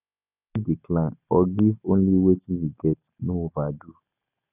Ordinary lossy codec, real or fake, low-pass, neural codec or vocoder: none; real; 3.6 kHz; none